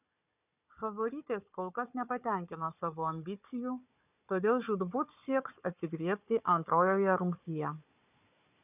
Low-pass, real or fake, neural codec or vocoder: 3.6 kHz; fake; codec, 16 kHz, 4 kbps, FunCodec, trained on Chinese and English, 50 frames a second